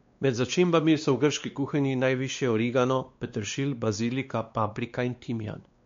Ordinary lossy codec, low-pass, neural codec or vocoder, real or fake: MP3, 48 kbps; 7.2 kHz; codec, 16 kHz, 2 kbps, X-Codec, WavLM features, trained on Multilingual LibriSpeech; fake